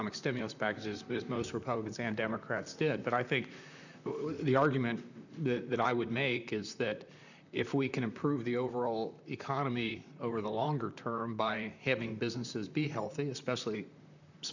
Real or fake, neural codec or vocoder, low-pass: fake; vocoder, 44.1 kHz, 128 mel bands, Pupu-Vocoder; 7.2 kHz